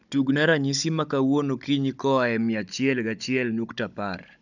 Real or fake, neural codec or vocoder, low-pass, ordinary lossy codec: fake; codec, 16 kHz, 16 kbps, FunCodec, trained on Chinese and English, 50 frames a second; 7.2 kHz; none